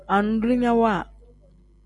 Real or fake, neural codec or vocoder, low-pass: real; none; 10.8 kHz